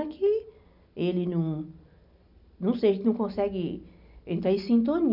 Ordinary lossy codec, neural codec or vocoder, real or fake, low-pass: none; none; real; 5.4 kHz